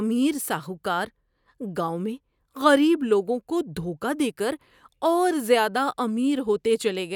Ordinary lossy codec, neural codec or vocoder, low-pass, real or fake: none; none; 19.8 kHz; real